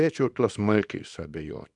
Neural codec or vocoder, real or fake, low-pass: codec, 24 kHz, 0.9 kbps, WavTokenizer, small release; fake; 10.8 kHz